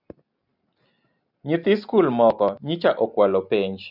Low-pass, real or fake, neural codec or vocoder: 5.4 kHz; real; none